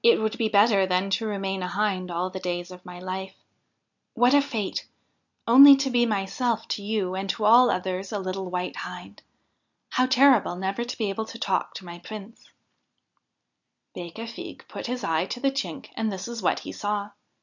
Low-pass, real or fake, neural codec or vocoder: 7.2 kHz; real; none